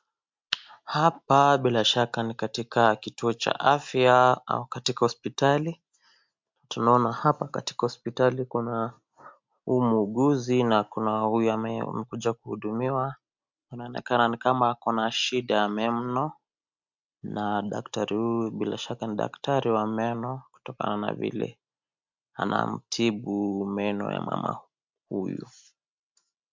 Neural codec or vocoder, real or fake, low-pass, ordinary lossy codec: none; real; 7.2 kHz; MP3, 64 kbps